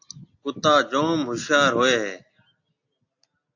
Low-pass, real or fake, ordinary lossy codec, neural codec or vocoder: 7.2 kHz; real; AAC, 48 kbps; none